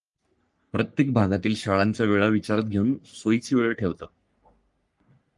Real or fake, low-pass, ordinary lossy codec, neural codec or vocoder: fake; 10.8 kHz; Opus, 32 kbps; codec, 44.1 kHz, 3.4 kbps, Pupu-Codec